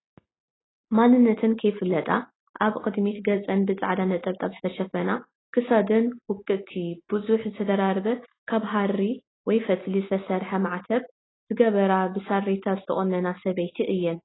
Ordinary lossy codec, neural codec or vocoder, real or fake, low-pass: AAC, 16 kbps; none; real; 7.2 kHz